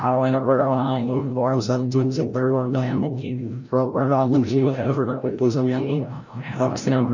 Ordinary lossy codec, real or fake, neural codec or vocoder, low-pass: none; fake; codec, 16 kHz, 0.5 kbps, FreqCodec, larger model; 7.2 kHz